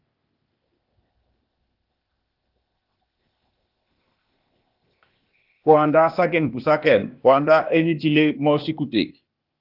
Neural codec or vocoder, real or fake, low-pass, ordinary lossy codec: codec, 16 kHz, 0.8 kbps, ZipCodec; fake; 5.4 kHz; Opus, 32 kbps